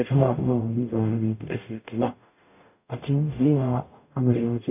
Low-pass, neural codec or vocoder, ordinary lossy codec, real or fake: 3.6 kHz; codec, 44.1 kHz, 0.9 kbps, DAC; none; fake